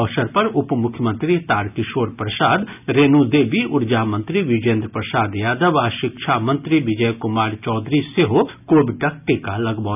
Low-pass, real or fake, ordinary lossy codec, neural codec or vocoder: 3.6 kHz; real; none; none